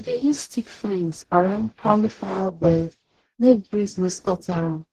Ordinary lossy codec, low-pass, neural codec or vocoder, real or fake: Opus, 16 kbps; 14.4 kHz; codec, 44.1 kHz, 0.9 kbps, DAC; fake